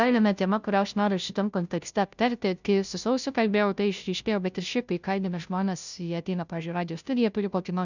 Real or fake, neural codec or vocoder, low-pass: fake; codec, 16 kHz, 0.5 kbps, FunCodec, trained on Chinese and English, 25 frames a second; 7.2 kHz